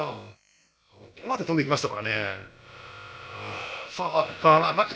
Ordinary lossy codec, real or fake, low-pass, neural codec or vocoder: none; fake; none; codec, 16 kHz, about 1 kbps, DyCAST, with the encoder's durations